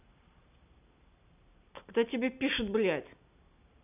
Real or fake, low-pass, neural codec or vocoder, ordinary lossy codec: real; 3.6 kHz; none; none